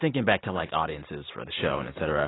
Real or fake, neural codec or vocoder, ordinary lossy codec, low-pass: real; none; AAC, 16 kbps; 7.2 kHz